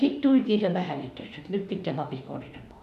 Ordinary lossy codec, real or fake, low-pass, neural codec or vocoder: none; fake; 14.4 kHz; autoencoder, 48 kHz, 32 numbers a frame, DAC-VAE, trained on Japanese speech